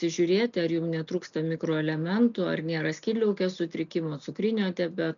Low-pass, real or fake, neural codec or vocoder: 7.2 kHz; real; none